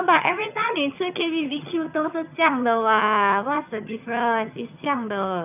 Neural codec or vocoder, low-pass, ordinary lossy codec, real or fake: vocoder, 22.05 kHz, 80 mel bands, HiFi-GAN; 3.6 kHz; none; fake